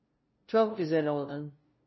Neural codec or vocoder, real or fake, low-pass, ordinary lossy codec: codec, 16 kHz, 0.5 kbps, FunCodec, trained on LibriTTS, 25 frames a second; fake; 7.2 kHz; MP3, 24 kbps